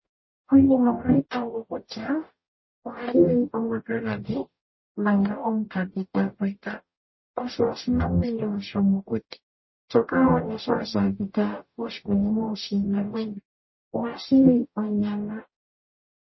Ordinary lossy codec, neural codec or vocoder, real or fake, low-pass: MP3, 24 kbps; codec, 44.1 kHz, 0.9 kbps, DAC; fake; 7.2 kHz